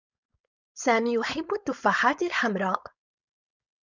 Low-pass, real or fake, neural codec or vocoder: 7.2 kHz; fake; codec, 16 kHz, 4.8 kbps, FACodec